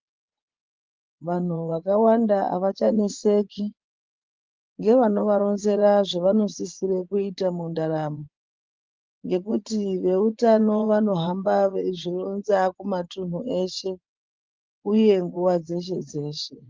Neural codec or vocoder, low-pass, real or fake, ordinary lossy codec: vocoder, 24 kHz, 100 mel bands, Vocos; 7.2 kHz; fake; Opus, 32 kbps